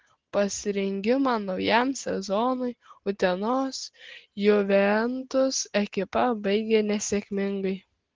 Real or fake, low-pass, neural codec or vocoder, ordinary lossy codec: real; 7.2 kHz; none; Opus, 16 kbps